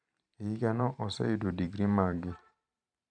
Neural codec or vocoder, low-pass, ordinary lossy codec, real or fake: vocoder, 44.1 kHz, 128 mel bands every 512 samples, BigVGAN v2; 9.9 kHz; none; fake